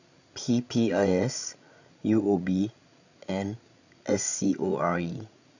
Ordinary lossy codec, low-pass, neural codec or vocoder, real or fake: none; 7.2 kHz; codec, 16 kHz, 16 kbps, FreqCodec, larger model; fake